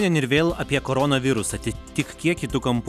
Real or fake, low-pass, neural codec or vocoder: real; 14.4 kHz; none